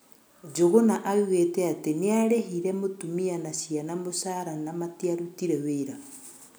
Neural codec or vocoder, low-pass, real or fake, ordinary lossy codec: none; none; real; none